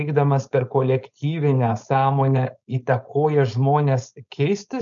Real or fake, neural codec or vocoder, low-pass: fake; codec, 16 kHz, 4.8 kbps, FACodec; 7.2 kHz